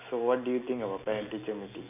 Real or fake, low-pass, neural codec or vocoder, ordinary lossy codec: real; 3.6 kHz; none; none